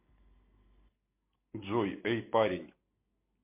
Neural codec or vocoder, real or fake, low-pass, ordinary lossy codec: none; real; 3.6 kHz; MP3, 24 kbps